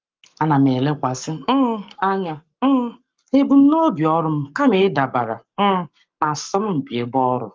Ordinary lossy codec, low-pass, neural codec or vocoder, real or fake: Opus, 24 kbps; 7.2 kHz; codec, 44.1 kHz, 7.8 kbps, Pupu-Codec; fake